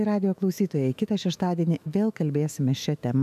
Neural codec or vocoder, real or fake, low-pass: autoencoder, 48 kHz, 128 numbers a frame, DAC-VAE, trained on Japanese speech; fake; 14.4 kHz